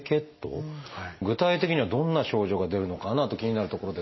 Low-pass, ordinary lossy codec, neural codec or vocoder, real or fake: 7.2 kHz; MP3, 24 kbps; none; real